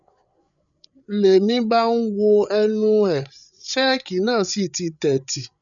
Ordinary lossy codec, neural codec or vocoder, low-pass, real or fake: none; codec, 16 kHz, 8 kbps, FreqCodec, larger model; 7.2 kHz; fake